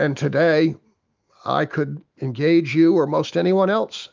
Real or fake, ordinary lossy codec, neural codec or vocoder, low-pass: fake; Opus, 32 kbps; autoencoder, 48 kHz, 128 numbers a frame, DAC-VAE, trained on Japanese speech; 7.2 kHz